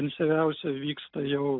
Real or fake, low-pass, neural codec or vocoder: real; 5.4 kHz; none